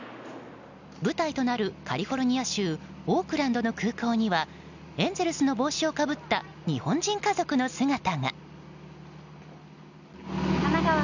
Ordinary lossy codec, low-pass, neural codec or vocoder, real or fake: none; 7.2 kHz; none; real